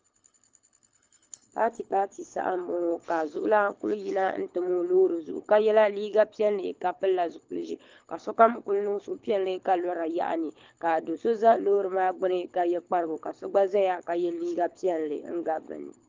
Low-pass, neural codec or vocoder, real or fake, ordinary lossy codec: 7.2 kHz; codec, 24 kHz, 6 kbps, HILCodec; fake; Opus, 24 kbps